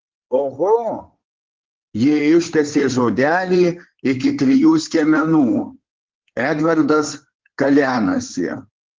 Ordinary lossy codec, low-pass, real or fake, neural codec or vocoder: Opus, 16 kbps; 7.2 kHz; fake; codec, 16 kHz in and 24 kHz out, 2.2 kbps, FireRedTTS-2 codec